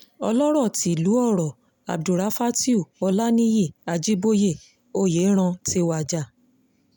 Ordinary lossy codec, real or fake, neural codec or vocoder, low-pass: none; real; none; 19.8 kHz